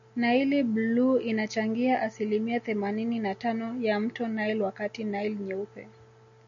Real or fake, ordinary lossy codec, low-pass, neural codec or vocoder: real; AAC, 64 kbps; 7.2 kHz; none